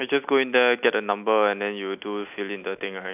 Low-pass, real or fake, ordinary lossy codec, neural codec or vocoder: 3.6 kHz; real; none; none